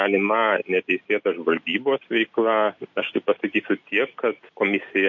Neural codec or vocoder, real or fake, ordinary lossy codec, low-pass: none; real; MP3, 48 kbps; 7.2 kHz